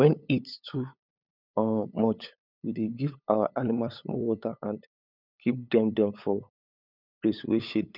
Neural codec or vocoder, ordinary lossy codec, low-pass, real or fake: codec, 16 kHz, 8 kbps, FunCodec, trained on LibriTTS, 25 frames a second; none; 5.4 kHz; fake